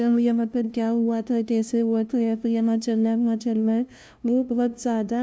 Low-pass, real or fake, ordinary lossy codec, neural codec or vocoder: none; fake; none; codec, 16 kHz, 0.5 kbps, FunCodec, trained on LibriTTS, 25 frames a second